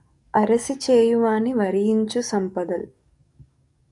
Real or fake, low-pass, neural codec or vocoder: fake; 10.8 kHz; codec, 44.1 kHz, 7.8 kbps, DAC